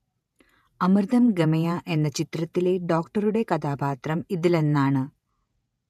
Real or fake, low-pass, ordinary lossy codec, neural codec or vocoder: fake; 14.4 kHz; none; vocoder, 48 kHz, 128 mel bands, Vocos